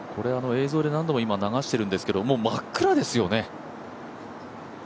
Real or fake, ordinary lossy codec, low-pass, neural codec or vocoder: real; none; none; none